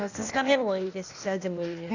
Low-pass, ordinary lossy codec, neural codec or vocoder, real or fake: 7.2 kHz; none; codec, 16 kHz, 0.8 kbps, ZipCodec; fake